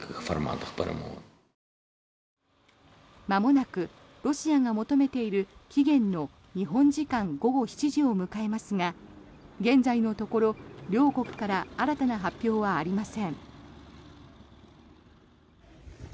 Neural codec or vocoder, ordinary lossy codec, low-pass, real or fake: none; none; none; real